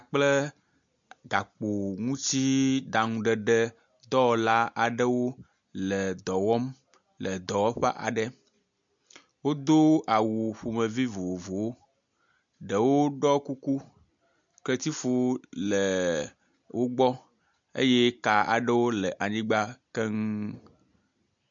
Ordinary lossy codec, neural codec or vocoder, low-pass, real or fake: MP3, 64 kbps; none; 7.2 kHz; real